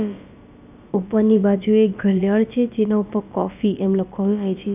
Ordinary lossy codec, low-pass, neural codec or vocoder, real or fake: none; 3.6 kHz; codec, 16 kHz, about 1 kbps, DyCAST, with the encoder's durations; fake